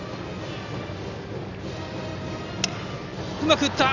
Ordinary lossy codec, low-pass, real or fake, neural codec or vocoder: none; 7.2 kHz; real; none